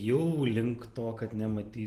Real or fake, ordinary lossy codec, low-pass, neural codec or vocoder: fake; Opus, 24 kbps; 14.4 kHz; vocoder, 44.1 kHz, 128 mel bands every 512 samples, BigVGAN v2